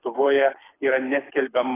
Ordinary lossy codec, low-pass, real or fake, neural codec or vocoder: AAC, 16 kbps; 3.6 kHz; fake; codec, 24 kHz, 6 kbps, HILCodec